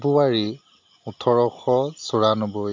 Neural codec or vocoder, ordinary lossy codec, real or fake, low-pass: none; AAC, 48 kbps; real; 7.2 kHz